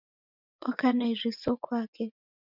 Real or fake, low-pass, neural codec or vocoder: fake; 5.4 kHz; vocoder, 24 kHz, 100 mel bands, Vocos